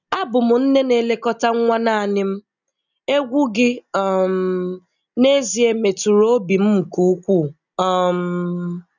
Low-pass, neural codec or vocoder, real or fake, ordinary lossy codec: 7.2 kHz; none; real; none